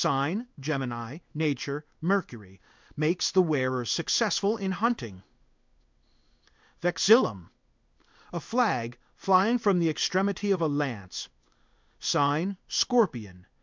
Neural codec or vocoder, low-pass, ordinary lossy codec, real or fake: codec, 16 kHz in and 24 kHz out, 1 kbps, XY-Tokenizer; 7.2 kHz; MP3, 64 kbps; fake